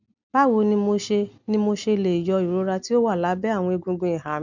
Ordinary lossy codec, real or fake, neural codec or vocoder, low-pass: none; real; none; 7.2 kHz